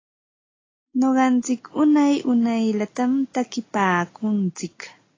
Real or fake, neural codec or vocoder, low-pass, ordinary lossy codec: real; none; 7.2 kHz; AAC, 32 kbps